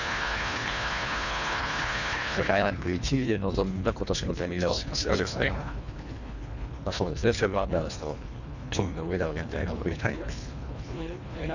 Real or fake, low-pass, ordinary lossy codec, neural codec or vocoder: fake; 7.2 kHz; none; codec, 24 kHz, 1.5 kbps, HILCodec